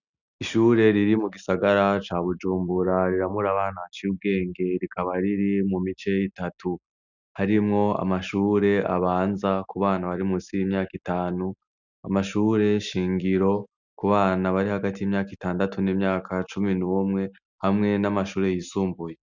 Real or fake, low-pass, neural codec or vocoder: real; 7.2 kHz; none